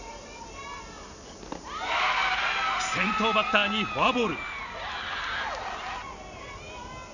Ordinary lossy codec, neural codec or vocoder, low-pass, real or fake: none; none; 7.2 kHz; real